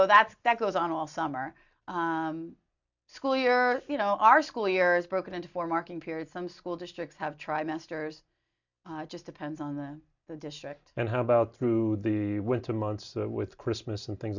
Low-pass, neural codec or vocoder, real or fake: 7.2 kHz; none; real